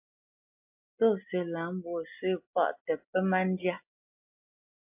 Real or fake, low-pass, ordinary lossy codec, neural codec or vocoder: real; 3.6 kHz; MP3, 32 kbps; none